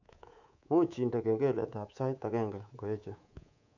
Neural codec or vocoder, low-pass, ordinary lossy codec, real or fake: codec, 24 kHz, 3.1 kbps, DualCodec; 7.2 kHz; none; fake